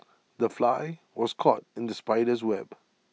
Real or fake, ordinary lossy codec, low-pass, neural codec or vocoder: real; none; none; none